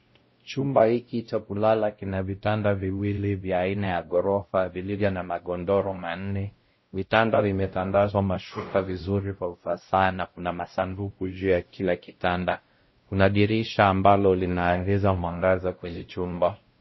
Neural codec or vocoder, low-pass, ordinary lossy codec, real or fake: codec, 16 kHz, 0.5 kbps, X-Codec, WavLM features, trained on Multilingual LibriSpeech; 7.2 kHz; MP3, 24 kbps; fake